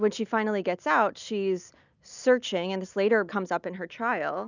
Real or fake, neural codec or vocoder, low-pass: real; none; 7.2 kHz